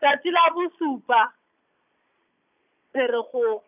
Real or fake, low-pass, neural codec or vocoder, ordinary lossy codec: fake; 3.6 kHz; vocoder, 22.05 kHz, 80 mel bands, Vocos; none